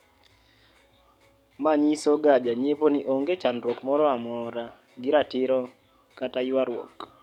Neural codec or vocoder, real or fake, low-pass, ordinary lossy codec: codec, 44.1 kHz, 7.8 kbps, DAC; fake; 19.8 kHz; none